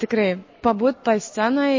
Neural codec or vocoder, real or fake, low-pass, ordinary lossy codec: none; real; 7.2 kHz; MP3, 32 kbps